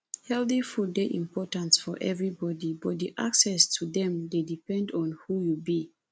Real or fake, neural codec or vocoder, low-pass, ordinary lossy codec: real; none; none; none